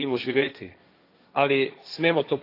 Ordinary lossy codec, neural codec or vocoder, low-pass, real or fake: AAC, 32 kbps; codec, 16 kHz, 1.1 kbps, Voila-Tokenizer; 5.4 kHz; fake